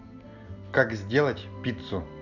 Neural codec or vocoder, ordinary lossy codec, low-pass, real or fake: none; none; 7.2 kHz; real